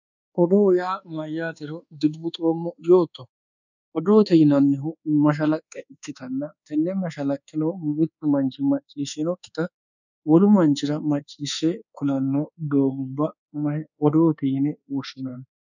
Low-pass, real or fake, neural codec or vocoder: 7.2 kHz; fake; codec, 24 kHz, 1.2 kbps, DualCodec